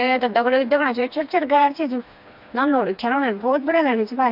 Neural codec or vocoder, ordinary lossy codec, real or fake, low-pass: codec, 16 kHz, 2 kbps, FreqCodec, smaller model; MP3, 48 kbps; fake; 5.4 kHz